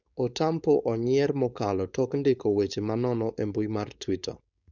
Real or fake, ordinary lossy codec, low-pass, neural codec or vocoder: fake; none; 7.2 kHz; codec, 16 kHz, 4.8 kbps, FACodec